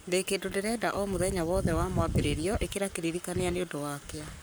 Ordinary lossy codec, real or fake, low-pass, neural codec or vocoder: none; fake; none; codec, 44.1 kHz, 7.8 kbps, Pupu-Codec